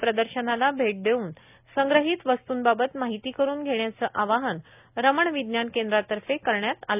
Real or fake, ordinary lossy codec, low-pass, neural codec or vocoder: real; none; 3.6 kHz; none